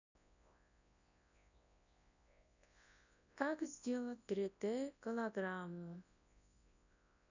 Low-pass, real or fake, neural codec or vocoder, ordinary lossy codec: 7.2 kHz; fake; codec, 24 kHz, 0.9 kbps, WavTokenizer, large speech release; none